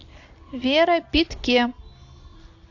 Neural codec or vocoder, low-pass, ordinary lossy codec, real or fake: none; 7.2 kHz; AAC, 48 kbps; real